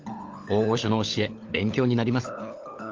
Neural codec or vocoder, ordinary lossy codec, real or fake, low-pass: codec, 16 kHz, 16 kbps, FunCodec, trained on LibriTTS, 50 frames a second; Opus, 32 kbps; fake; 7.2 kHz